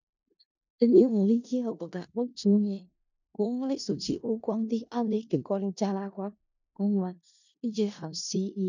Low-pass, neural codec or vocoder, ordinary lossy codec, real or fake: 7.2 kHz; codec, 16 kHz in and 24 kHz out, 0.4 kbps, LongCat-Audio-Codec, four codebook decoder; none; fake